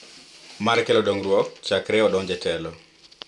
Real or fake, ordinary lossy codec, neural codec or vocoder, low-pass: real; none; none; 10.8 kHz